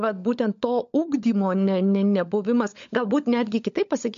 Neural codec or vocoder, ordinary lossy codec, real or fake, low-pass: codec, 16 kHz, 16 kbps, FunCodec, trained on LibriTTS, 50 frames a second; MP3, 48 kbps; fake; 7.2 kHz